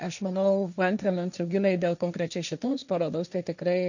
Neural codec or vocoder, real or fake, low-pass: codec, 16 kHz, 1.1 kbps, Voila-Tokenizer; fake; 7.2 kHz